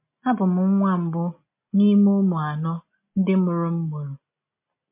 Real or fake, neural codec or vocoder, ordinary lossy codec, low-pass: real; none; MP3, 24 kbps; 3.6 kHz